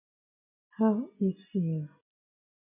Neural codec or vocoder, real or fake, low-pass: none; real; 3.6 kHz